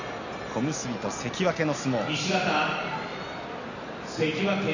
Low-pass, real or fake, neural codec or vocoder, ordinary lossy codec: 7.2 kHz; real; none; none